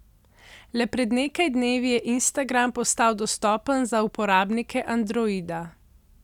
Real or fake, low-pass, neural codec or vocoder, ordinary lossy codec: real; 19.8 kHz; none; none